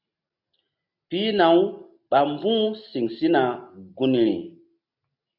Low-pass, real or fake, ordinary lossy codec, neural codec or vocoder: 5.4 kHz; real; AAC, 48 kbps; none